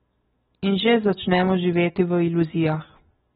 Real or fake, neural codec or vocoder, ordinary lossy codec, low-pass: real; none; AAC, 16 kbps; 19.8 kHz